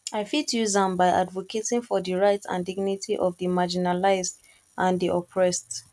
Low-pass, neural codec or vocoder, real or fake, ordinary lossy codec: none; none; real; none